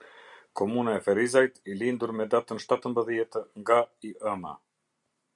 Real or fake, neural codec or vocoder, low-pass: real; none; 10.8 kHz